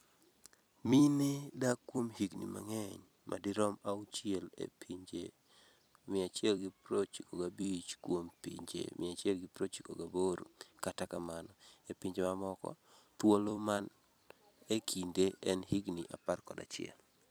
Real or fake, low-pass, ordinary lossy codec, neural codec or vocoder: fake; none; none; vocoder, 44.1 kHz, 128 mel bands every 256 samples, BigVGAN v2